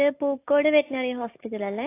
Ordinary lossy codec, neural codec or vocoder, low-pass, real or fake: AAC, 24 kbps; none; 3.6 kHz; real